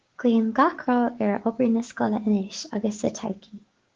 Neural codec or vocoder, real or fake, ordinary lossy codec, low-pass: codec, 16 kHz, 6 kbps, DAC; fake; Opus, 16 kbps; 7.2 kHz